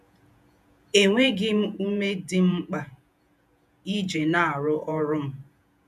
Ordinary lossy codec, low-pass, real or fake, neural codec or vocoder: none; 14.4 kHz; fake; vocoder, 48 kHz, 128 mel bands, Vocos